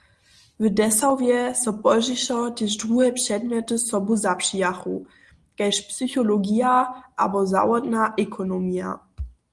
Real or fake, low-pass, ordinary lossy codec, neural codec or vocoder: real; 10.8 kHz; Opus, 24 kbps; none